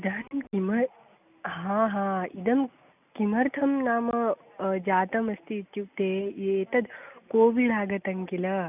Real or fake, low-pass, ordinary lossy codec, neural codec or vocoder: real; 3.6 kHz; none; none